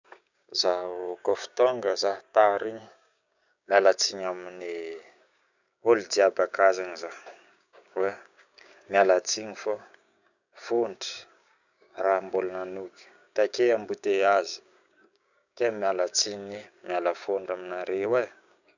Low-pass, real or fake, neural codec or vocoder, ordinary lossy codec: 7.2 kHz; fake; codec, 16 kHz, 6 kbps, DAC; none